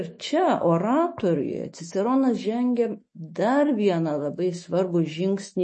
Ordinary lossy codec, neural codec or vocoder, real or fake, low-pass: MP3, 32 kbps; autoencoder, 48 kHz, 128 numbers a frame, DAC-VAE, trained on Japanese speech; fake; 10.8 kHz